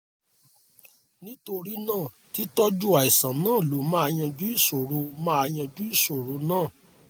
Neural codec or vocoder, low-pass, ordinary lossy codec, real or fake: none; none; none; real